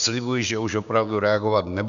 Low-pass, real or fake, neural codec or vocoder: 7.2 kHz; fake; codec, 16 kHz, 6 kbps, DAC